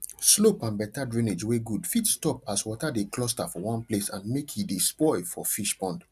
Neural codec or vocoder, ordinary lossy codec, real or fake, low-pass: none; none; real; 14.4 kHz